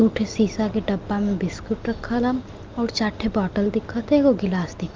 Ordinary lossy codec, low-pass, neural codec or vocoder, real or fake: Opus, 32 kbps; 7.2 kHz; none; real